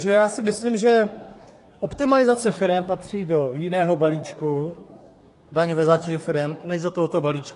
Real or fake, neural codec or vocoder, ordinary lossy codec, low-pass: fake; codec, 24 kHz, 1 kbps, SNAC; AAC, 48 kbps; 10.8 kHz